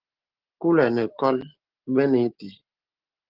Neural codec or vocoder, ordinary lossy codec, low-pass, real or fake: none; Opus, 16 kbps; 5.4 kHz; real